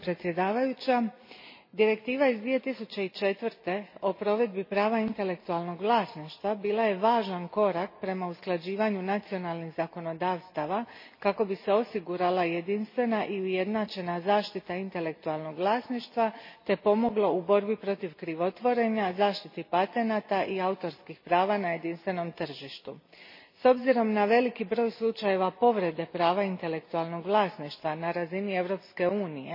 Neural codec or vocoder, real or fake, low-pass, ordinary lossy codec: none; real; 5.4 kHz; MP3, 24 kbps